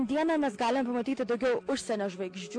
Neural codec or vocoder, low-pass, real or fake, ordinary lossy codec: none; 9.9 kHz; real; AAC, 48 kbps